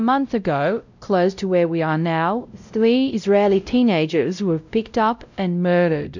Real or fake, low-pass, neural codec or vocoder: fake; 7.2 kHz; codec, 16 kHz, 0.5 kbps, X-Codec, WavLM features, trained on Multilingual LibriSpeech